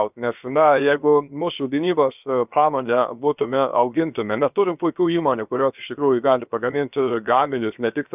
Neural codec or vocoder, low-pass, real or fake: codec, 16 kHz, 0.7 kbps, FocalCodec; 3.6 kHz; fake